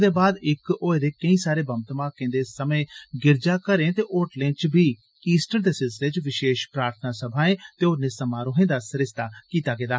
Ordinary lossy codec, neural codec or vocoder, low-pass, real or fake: none; none; none; real